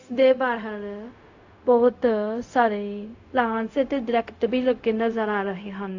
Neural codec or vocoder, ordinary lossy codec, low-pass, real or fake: codec, 16 kHz, 0.4 kbps, LongCat-Audio-Codec; AAC, 48 kbps; 7.2 kHz; fake